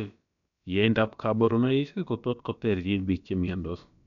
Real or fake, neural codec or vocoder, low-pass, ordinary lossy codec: fake; codec, 16 kHz, about 1 kbps, DyCAST, with the encoder's durations; 7.2 kHz; none